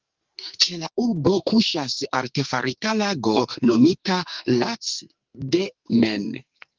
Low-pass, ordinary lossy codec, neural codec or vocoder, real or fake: 7.2 kHz; Opus, 32 kbps; codec, 44.1 kHz, 2.6 kbps, SNAC; fake